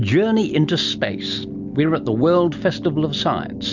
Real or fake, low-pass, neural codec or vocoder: real; 7.2 kHz; none